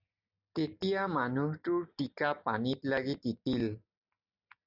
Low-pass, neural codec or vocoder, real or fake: 5.4 kHz; none; real